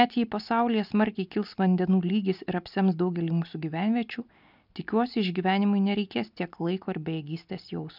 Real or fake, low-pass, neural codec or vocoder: real; 5.4 kHz; none